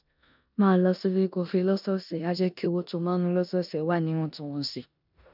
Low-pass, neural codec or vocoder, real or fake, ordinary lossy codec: 5.4 kHz; codec, 16 kHz in and 24 kHz out, 0.9 kbps, LongCat-Audio-Codec, four codebook decoder; fake; none